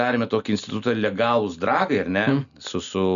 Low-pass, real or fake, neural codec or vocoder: 7.2 kHz; real; none